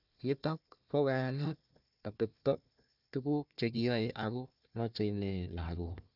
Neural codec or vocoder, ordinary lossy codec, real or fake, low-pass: codec, 16 kHz, 1 kbps, FunCodec, trained on Chinese and English, 50 frames a second; none; fake; 5.4 kHz